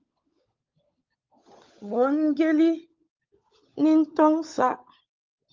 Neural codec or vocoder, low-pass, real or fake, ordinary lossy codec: codec, 16 kHz, 16 kbps, FunCodec, trained on LibriTTS, 50 frames a second; 7.2 kHz; fake; Opus, 24 kbps